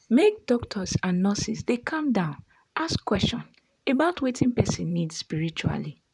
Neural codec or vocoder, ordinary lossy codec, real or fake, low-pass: vocoder, 44.1 kHz, 128 mel bands, Pupu-Vocoder; none; fake; 10.8 kHz